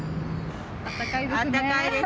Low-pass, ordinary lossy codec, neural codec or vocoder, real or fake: none; none; none; real